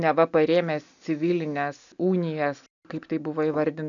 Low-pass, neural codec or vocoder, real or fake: 7.2 kHz; none; real